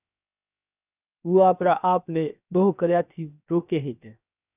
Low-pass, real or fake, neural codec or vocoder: 3.6 kHz; fake; codec, 16 kHz, 0.7 kbps, FocalCodec